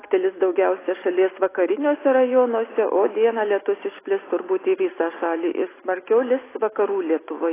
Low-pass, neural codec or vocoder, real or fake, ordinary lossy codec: 3.6 kHz; none; real; AAC, 16 kbps